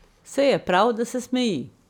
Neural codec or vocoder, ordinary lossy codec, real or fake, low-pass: none; none; real; 19.8 kHz